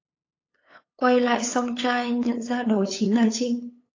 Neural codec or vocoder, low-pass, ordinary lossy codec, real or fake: codec, 16 kHz, 8 kbps, FunCodec, trained on LibriTTS, 25 frames a second; 7.2 kHz; AAC, 32 kbps; fake